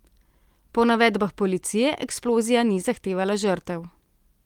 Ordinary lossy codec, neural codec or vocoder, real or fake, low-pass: Opus, 24 kbps; none; real; 19.8 kHz